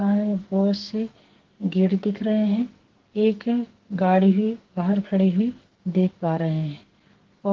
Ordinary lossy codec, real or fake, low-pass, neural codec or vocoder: Opus, 16 kbps; fake; 7.2 kHz; codec, 44.1 kHz, 2.6 kbps, SNAC